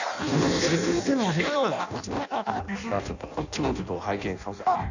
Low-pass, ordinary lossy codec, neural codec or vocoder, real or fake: 7.2 kHz; none; codec, 16 kHz in and 24 kHz out, 0.6 kbps, FireRedTTS-2 codec; fake